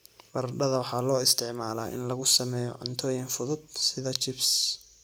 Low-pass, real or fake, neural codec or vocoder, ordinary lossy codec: none; real; none; none